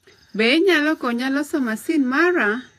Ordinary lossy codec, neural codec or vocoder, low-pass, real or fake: AAC, 64 kbps; vocoder, 48 kHz, 128 mel bands, Vocos; 14.4 kHz; fake